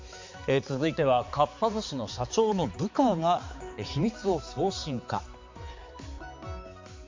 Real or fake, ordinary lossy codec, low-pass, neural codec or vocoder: fake; MP3, 48 kbps; 7.2 kHz; codec, 16 kHz, 4 kbps, X-Codec, HuBERT features, trained on balanced general audio